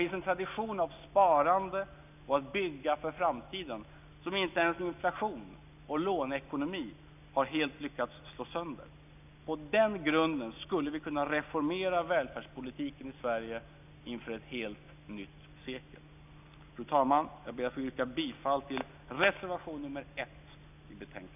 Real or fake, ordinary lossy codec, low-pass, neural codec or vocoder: real; none; 3.6 kHz; none